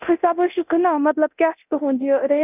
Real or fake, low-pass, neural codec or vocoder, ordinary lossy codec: fake; 3.6 kHz; codec, 24 kHz, 0.9 kbps, DualCodec; none